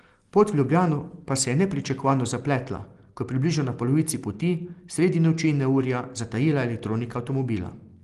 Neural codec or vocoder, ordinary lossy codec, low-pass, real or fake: none; Opus, 24 kbps; 10.8 kHz; real